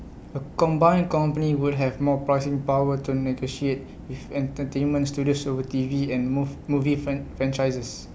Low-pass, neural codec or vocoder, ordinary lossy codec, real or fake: none; none; none; real